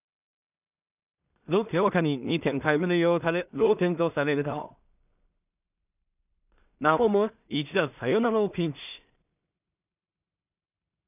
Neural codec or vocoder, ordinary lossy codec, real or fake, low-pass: codec, 16 kHz in and 24 kHz out, 0.4 kbps, LongCat-Audio-Codec, two codebook decoder; none; fake; 3.6 kHz